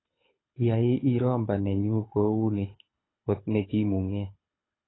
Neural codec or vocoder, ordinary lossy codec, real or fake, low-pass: codec, 24 kHz, 6 kbps, HILCodec; AAC, 16 kbps; fake; 7.2 kHz